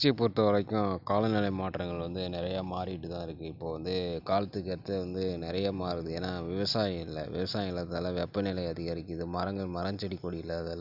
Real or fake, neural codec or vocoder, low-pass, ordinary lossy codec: real; none; 5.4 kHz; none